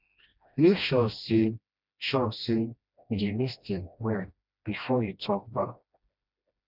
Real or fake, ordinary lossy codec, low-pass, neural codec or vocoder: fake; none; 5.4 kHz; codec, 16 kHz, 1 kbps, FreqCodec, smaller model